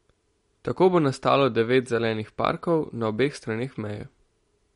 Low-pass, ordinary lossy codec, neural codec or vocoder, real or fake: 10.8 kHz; MP3, 48 kbps; none; real